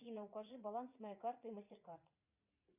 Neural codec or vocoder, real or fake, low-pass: vocoder, 44.1 kHz, 80 mel bands, Vocos; fake; 3.6 kHz